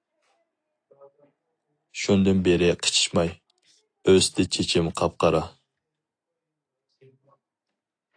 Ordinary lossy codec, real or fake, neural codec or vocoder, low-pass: AAC, 48 kbps; real; none; 9.9 kHz